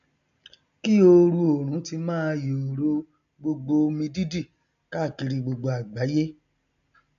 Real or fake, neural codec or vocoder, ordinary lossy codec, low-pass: real; none; none; 7.2 kHz